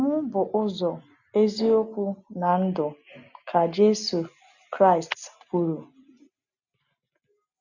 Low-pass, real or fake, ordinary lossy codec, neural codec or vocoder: 7.2 kHz; real; none; none